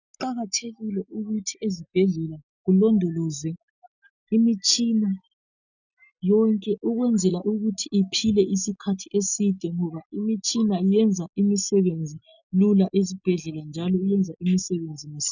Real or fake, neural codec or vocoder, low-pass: real; none; 7.2 kHz